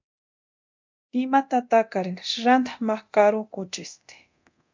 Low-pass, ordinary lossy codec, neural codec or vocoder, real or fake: 7.2 kHz; MP3, 64 kbps; codec, 24 kHz, 0.9 kbps, DualCodec; fake